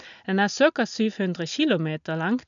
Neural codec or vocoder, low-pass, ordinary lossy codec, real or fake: none; 7.2 kHz; none; real